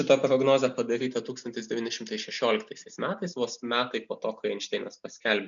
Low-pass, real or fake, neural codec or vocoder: 7.2 kHz; real; none